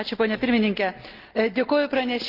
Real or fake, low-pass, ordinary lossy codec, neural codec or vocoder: real; 5.4 kHz; Opus, 24 kbps; none